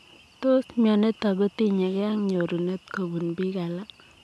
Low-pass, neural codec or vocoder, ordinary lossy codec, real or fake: none; none; none; real